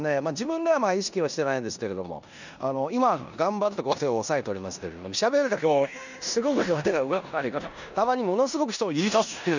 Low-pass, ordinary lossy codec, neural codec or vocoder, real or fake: 7.2 kHz; none; codec, 16 kHz in and 24 kHz out, 0.9 kbps, LongCat-Audio-Codec, four codebook decoder; fake